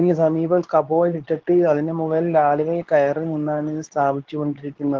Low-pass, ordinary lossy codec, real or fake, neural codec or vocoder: 7.2 kHz; Opus, 16 kbps; fake; codec, 24 kHz, 0.9 kbps, WavTokenizer, medium speech release version 2